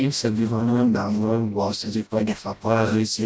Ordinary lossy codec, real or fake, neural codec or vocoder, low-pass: none; fake; codec, 16 kHz, 0.5 kbps, FreqCodec, smaller model; none